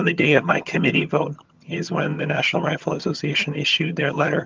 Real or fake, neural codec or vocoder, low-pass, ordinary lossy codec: fake; vocoder, 22.05 kHz, 80 mel bands, HiFi-GAN; 7.2 kHz; Opus, 24 kbps